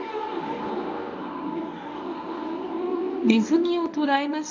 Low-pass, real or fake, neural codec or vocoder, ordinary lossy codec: 7.2 kHz; fake; codec, 24 kHz, 0.9 kbps, WavTokenizer, medium speech release version 1; none